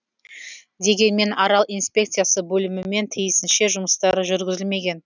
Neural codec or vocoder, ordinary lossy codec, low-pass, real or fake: none; none; 7.2 kHz; real